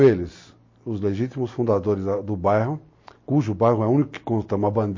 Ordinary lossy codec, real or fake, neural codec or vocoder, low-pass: MP3, 32 kbps; real; none; 7.2 kHz